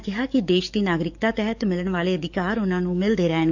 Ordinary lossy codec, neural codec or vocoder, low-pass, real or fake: none; codec, 44.1 kHz, 7.8 kbps, DAC; 7.2 kHz; fake